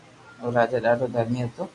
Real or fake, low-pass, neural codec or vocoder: real; 10.8 kHz; none